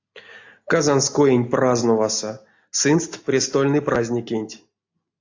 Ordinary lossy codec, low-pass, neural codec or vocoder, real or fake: AAC, 48 kbps; 7.2 kHz; none; real